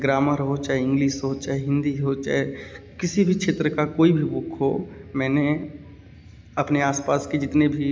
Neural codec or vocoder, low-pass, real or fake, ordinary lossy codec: none; none; real; none